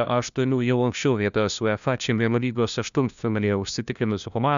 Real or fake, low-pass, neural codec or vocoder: fake; 7.2 kHz; codec, 16 kHz, 1 kbps, FunCodec, trained on LibriTTS, 50 frames a second